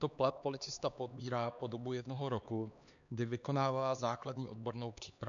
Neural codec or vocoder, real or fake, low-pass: codec, 16 kHz, 2 kbps, X-Codec, HuBERT features, trained on LibriSpeech; fake; 7.2 kHz